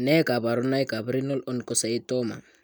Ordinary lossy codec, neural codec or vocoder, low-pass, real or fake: none; none; none; real